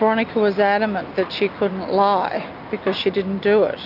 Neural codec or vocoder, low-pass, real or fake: none; 5.4 kHz; real